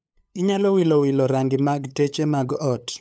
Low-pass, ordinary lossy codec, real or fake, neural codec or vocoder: none; none; fake; codec, 16 kHz, 8 kbps, FunCodec, trained on LibriTTS, 25 frames a second